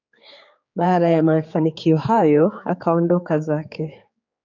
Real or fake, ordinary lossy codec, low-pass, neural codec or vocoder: fake; AAC, 48 kbps; 7.2 kHz; codec, 16 kHz, 4 kbps, X-Codec, HuBERT features, trained on general audio